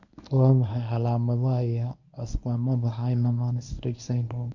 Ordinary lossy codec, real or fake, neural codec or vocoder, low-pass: none; fake; codec, 24 kHz, 0.9 kbps, WavTokenizer, medium speech release version 1; 7.2 kHz